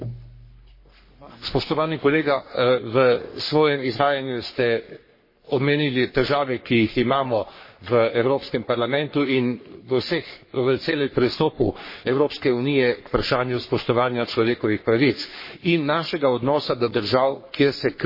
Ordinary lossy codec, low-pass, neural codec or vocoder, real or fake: MP3, 24 kbps; 5.4 kHz; codec, 44.1 kHz, 3.4 kbps, Pupu-Codec; fake